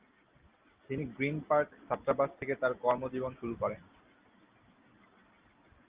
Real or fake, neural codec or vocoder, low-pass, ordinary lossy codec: real; none; 3.6 kHz; Opus, 32 kbps